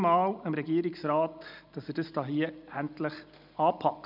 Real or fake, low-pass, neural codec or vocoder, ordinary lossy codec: real; 5.4 kHz; none; none